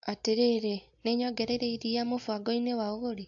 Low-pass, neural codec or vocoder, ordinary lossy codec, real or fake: 7.2 kHz; none; AAC, 64 kbps; real